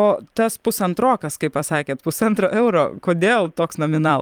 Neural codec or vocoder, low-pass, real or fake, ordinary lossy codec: vocoder, 44.1 kHz, 128 mel bands every 512 samples, BigVGAN v2; 19.8 kHz; fake; Opus, 32 kbps